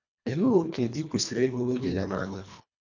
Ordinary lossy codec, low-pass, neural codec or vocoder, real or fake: none; 7.2 kHz; codec, 24 kHz, 1.5 kbps, HILCodec; fake